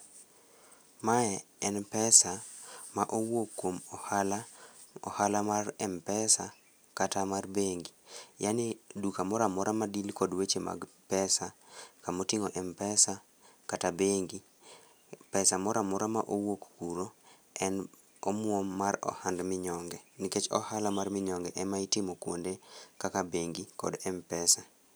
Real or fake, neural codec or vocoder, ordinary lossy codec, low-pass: real; none; none; none